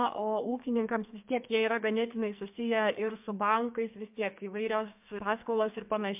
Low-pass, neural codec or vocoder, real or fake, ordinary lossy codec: 3.6 kHz; codec, 44.1 kHz, 2.6 kbps, SNAC; fake; MP3, 32 kbps